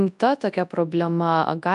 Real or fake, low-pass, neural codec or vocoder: fake; 10.8 kHz; codec, 24 kHz, 0.9 kbps, WavTokenizer, large speech release